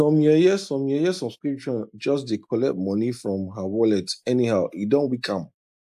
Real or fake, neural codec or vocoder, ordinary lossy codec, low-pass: real; none; AAC, 96 kbps; 14.4 kHz